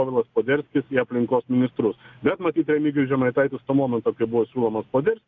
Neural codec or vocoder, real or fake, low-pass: none; real; 7.2 kHz